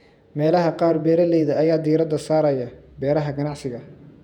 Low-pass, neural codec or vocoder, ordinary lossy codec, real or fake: 19.8 kHz; vocoder, 48 kHz, 128 mel bands, Vocos; none; fake